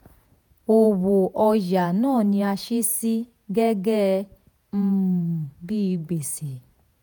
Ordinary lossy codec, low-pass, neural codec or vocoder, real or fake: none; none; vocoder, 48 kHz, 128 mel bands, Vocos; fake